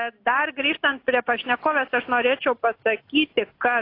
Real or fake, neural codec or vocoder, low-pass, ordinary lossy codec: real; none; 5.4 kHz; AAC, 32 kbps